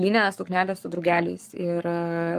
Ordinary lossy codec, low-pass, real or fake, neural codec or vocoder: Opus, 32 kbps; 14.4 kHz; fake; codec, 44.1 kHz, 7.8 kbps, Pupu-Codec